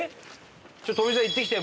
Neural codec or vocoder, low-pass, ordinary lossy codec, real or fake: none; none; none; real